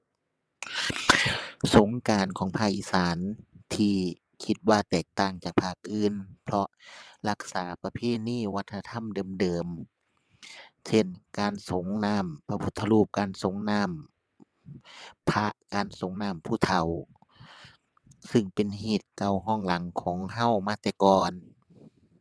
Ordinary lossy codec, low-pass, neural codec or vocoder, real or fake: none; none; vocoder, 22.05 kHz, 80 mel bands, WaveNeXt; fake